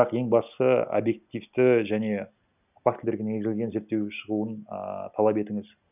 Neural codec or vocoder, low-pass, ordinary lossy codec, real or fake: none; 3.6 kHz; none; real